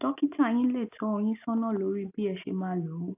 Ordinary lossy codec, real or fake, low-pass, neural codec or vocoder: AAC, 24 kbps; real; 3.6 kHz; none